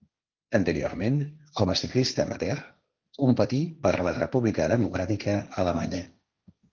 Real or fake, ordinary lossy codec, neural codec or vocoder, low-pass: fake; Opus, 24 kbps; codec, 16 kHz, 1.1 kbps, Voila-Tokenizer; 7.2 kHz